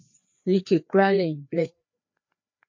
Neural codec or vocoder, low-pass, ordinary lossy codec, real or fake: codec, 16 kHz, 2 kbps, FreqCodec, larger model; 7.2 kHz; MP3, 48 kbps; fake